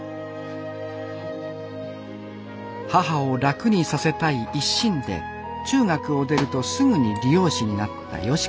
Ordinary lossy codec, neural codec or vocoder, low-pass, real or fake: none; none; none; real